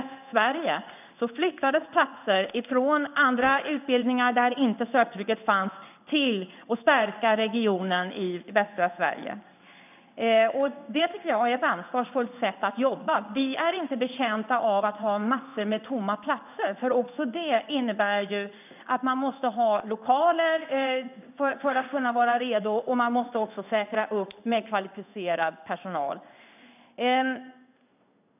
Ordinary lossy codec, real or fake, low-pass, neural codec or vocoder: none; fake; 3.6 kHz; codec, 16 kHz in and 24 kHz out, 1 kbps, XY-Tokenizer